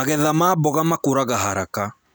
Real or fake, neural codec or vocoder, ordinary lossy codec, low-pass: real; none; none; none